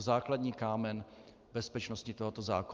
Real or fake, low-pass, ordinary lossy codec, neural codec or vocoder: real; 7.2 kHz; Opus, 24 kbps; none